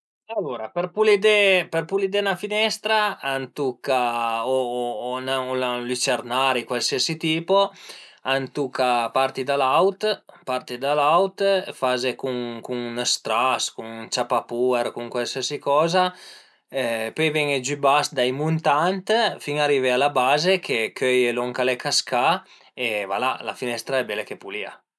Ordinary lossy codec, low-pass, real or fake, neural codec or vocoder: none; none; real; none